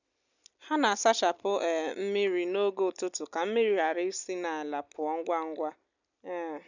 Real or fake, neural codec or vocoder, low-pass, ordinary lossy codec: real; none; 7.2 kHz; none